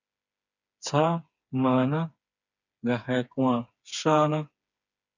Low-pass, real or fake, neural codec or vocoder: 7.2 kHz; fake; codec, 16 kHz, 4 kbps, FreqCodec, smaller model